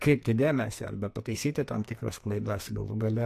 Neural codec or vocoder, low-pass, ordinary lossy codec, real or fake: codec, 44.1 kHz, 2.6 kbps, SNAC; 14.4 kHz; AAC, 64 kbps; fake